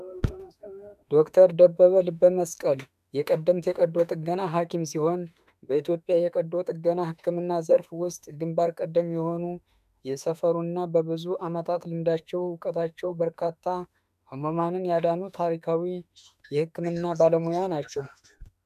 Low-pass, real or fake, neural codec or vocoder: 14.4 kHz; fake; autoencoder, 48 kHz, 32 numbers a frame, DAC-VAE, trained on Japanese speech